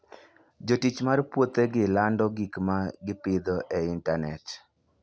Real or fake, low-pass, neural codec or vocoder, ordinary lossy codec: real; none; none; none